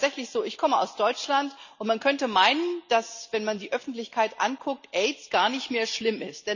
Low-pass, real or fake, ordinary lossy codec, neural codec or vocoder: 7.2 kHz; real; none; none